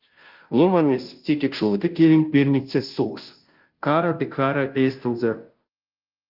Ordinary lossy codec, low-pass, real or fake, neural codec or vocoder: Opus, 32 kbps; 5.4 kHz; fake; codec, 16 kHz, 0.5 kbps, FunCodec, trained on Chinese and English, 25 frames a second